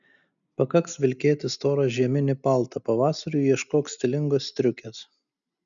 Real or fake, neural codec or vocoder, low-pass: real; none; 7.2 kHz